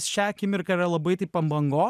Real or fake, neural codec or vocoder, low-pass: real; none; 14.4 kHz